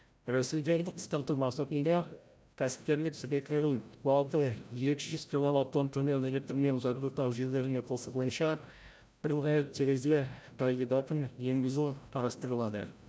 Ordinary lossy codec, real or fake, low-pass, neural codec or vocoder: none; fake; none; codec, 16 kHz, 0.5 kbps, FreqCodec, larger model